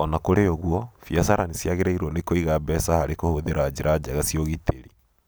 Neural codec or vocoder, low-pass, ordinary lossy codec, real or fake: none; none; none; real